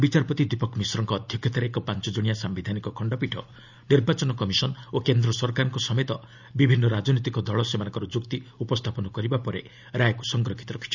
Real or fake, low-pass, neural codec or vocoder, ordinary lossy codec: real; 7.2 kHz; none; none